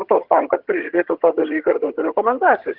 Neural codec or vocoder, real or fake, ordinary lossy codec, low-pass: vocoder, 22.05 kHz, 80 mel bands, HiFi-GAN; fake; Opus, 16 kbps; 5.4 kHz